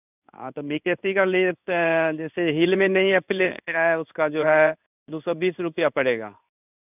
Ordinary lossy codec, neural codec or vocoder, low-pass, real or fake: none; codec, 16 kHz in and 24 kHz out, 1 kbps, XY-Tokenizer; 3.6 kHz; fake